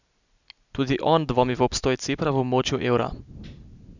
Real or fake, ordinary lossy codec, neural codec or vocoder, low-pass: real; none; none; 7.2 kHz